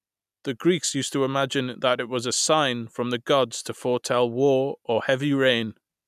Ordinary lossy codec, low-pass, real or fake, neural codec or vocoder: none; 14.4 kHz; real; none